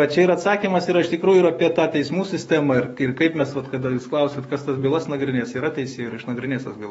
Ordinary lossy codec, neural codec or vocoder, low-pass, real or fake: AAC, 24 kbps; autoencoder, 48 kHz, 128 numbers a frame, DAC-VAE, trained on Japanese speech; 19.8 kHz; fake